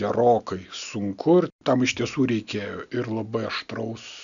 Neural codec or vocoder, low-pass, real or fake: none; 7.2 kHz; real